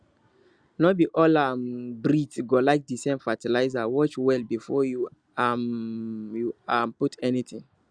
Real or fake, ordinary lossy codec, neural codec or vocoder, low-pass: real; Opus, 64 kbps; none; 9.9 kHz